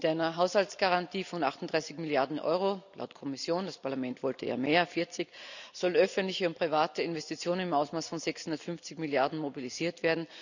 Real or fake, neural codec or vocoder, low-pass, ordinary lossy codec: real; none; 7.2 kHz; none